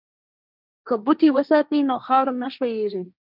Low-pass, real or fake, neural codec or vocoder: 5.4 kHz; fake; codec, 16 kHz, 1.1 kbps, Voila-Tokenizer